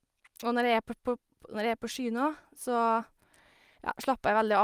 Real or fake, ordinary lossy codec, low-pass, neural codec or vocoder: real; Opus, 32 kbps; 14.4 kHz; none